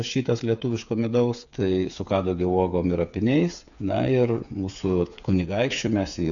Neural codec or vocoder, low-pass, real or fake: codec, 16 kHz, 8 kbps, FreqCodec, smaller model; 7.2 kHz; fake